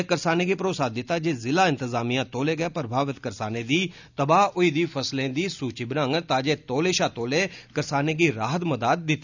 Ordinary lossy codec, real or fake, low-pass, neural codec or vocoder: none; real; 7.2 kHz; none